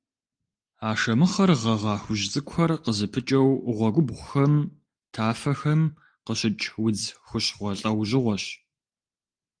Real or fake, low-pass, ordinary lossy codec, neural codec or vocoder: real; 9.9 kHz; Opus, 24 kbps; none